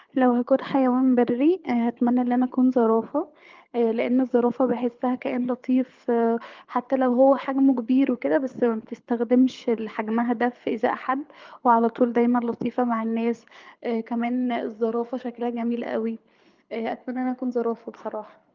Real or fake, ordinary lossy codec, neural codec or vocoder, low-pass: fake; Opus, 32 kbps; codec, 24 kHz, 6 kbps, HILCodec; 7.2 kHz